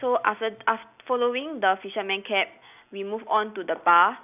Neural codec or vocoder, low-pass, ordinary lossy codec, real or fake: none; 3.6 kHz; none; real